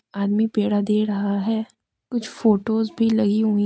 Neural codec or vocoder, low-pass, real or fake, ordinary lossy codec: none; none; real; none